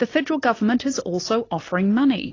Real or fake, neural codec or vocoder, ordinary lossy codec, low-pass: real; none; AAC, 32 kbps; 7.2 kHz